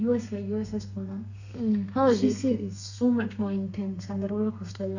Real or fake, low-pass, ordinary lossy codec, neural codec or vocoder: fake; 7.2 kHz; none; codec, 32 kHz, 1.9 kbps, SNAC